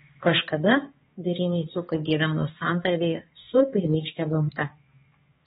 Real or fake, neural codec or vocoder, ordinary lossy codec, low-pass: fake; codec, 16 kHz, 2 kbps, X-Codec, HuBERT features, trained on balanced general audio; AAC, 16 kbps; 7.2 kHz